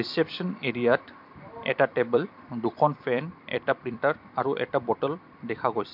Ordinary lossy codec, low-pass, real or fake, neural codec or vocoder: MP3, 48 kbps; 5.4 kHz; fake; vocoder, 44.1 kHz, 128 mel bands every 256 samples, BigVGAN v2